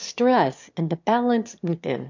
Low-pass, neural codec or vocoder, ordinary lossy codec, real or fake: 7.2 kHz; autoencoder, 22.05 kHz, a latent of 192 numbers a frame, VITS, trained on one speaker; MP3, 64 kbps; fake